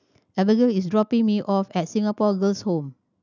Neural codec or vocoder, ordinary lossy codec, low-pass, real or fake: none; none; 7.2 kHz; real